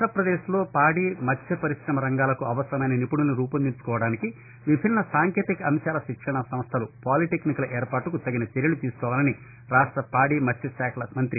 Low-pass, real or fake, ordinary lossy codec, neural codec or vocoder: 3.6 kHz; real; AAC, 24 kbps; none